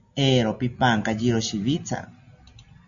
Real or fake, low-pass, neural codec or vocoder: real; 7.2 kHz; none